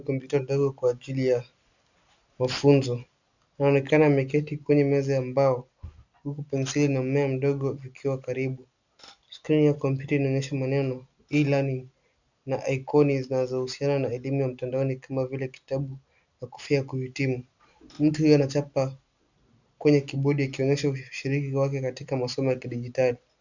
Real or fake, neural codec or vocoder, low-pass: real; none; 7.2 kHz